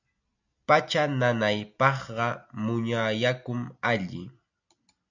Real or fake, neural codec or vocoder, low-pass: real; none; 7.2 kHz